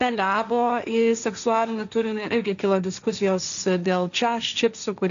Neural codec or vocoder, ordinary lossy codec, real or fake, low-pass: codec, 16 kHz, 1.1 kbps, Voila-Tokenizer; MP3, 96 kbps; fake; 7.2 kHz